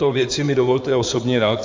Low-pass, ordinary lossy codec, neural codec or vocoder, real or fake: 7.2 kHz; MP3, 64 kbps; codec, 16 kHz in and 24 kHz out, 2.2 kbps, FireRedTTS-2 codec; fake